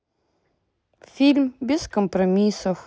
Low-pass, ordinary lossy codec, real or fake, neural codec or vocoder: none; none; real; none